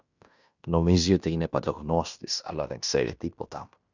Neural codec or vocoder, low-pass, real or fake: codec, 16 kHz in and 24 kHz out, 0.9 kbps, LongCat-Audio-Codec, fine tuned four codebook decoder; 7.2 kHz; fake